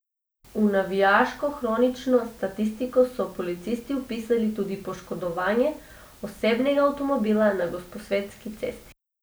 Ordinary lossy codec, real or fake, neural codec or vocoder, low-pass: none; real; none; none